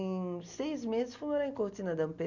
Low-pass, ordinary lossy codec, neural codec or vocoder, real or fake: 7.2 kHz; none; none; real